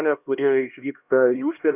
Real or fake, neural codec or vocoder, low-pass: fake; codec, 16 kHz, 1 kbps, X-Codec, HuBERT features, trained on LibriSpeech; 3.6 kHz